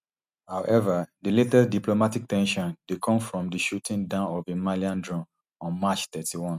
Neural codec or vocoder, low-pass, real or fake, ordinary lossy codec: none; 14.4 kHz; real; none